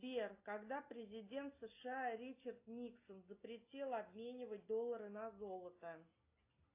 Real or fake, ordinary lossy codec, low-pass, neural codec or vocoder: real; AAC, 32 kbps; 3.6 kHz; none